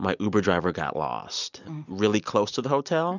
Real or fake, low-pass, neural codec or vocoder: real; 7.2 kHz; none